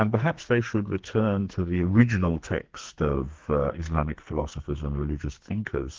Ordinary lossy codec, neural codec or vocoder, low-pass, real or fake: Opus, 16 kbps; codec, 44.1 kHz, 2.6 kbps, SNAC; 7.2 kHz; fake